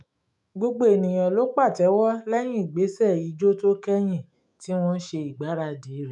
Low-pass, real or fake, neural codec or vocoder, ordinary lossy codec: 10.8 kHz; fake; autoencoder, 48 kHz, 128 numbers a frame, DAC-VAE, trained on Japanese speech; none